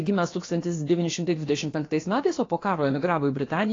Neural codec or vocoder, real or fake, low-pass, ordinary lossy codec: codec, 16 kHz, about 1 kbps, DyCAST, with the encoder's durations; fake; 7.2 kHz; AAC, 32 kbps